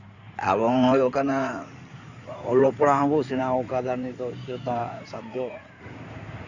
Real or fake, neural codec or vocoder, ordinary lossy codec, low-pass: fake; codec, 16 kHz in and 24 kHz out, 2.2 kbps, FireRedTTS-2 codec; none; 7.2 kHz